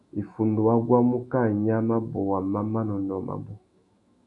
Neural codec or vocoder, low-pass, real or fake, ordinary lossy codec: autoencoder, 48 kHz, 128 numbers a frame, DAC-VAE, trained on Japanese speech; 10.8 kHz; fake; AAC, 64 kbps